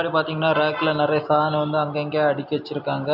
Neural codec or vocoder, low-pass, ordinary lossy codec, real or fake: none; 5.4 kHz; none; real